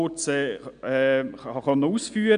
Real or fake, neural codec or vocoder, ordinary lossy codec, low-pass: real; none; none; 9.9 kHz